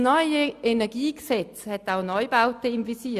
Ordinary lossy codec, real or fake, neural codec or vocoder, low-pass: Opus, 64 kbps; real; none; 14.4 kHz